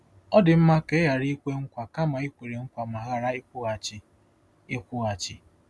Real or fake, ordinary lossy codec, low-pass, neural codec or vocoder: real; none; none; none